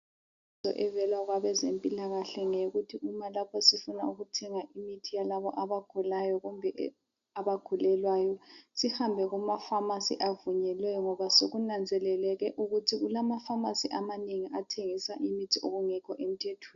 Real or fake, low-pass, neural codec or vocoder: real; 7.2 kHz; none